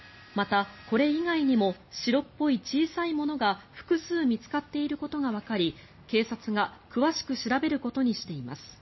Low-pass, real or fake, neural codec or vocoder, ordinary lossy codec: 7.2 kHz; real; none; MP3, 24 kbps